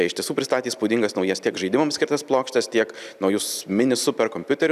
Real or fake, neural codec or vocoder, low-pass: real; none; 14.4 kHz